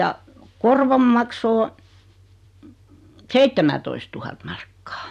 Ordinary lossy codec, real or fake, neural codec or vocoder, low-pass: none; fake; vocoder, 44.1 kHz, 128 mel bands every 256 samples, BigVGAN v2; 14.4 kHz